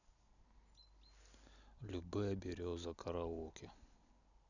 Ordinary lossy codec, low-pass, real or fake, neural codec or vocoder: none; 7.2 kHz; real; none